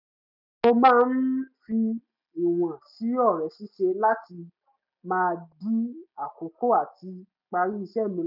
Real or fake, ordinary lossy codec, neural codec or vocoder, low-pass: real; none; none; 5.4 kHz